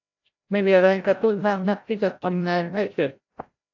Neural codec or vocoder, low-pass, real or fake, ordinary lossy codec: codec, 16 kHz, 0.5 kbps, FreqCodec, larger model; 7.2 kHz; fake; AAC, 48 kbps